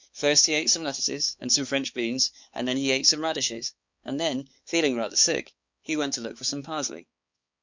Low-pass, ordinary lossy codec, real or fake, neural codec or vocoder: 7.2 kHz; Opus, 64 kbps; fake; codec, 16 kHz, 4 kbps, X-Codec, HuBERT features, trained on balanced general audio